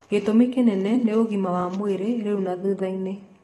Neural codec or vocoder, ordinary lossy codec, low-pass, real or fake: vocoder, 44.1 kHz, 128 mel bands every 256 samples, BigVGAN v2; AAC, 32 kbps; 19.8 kHz; fake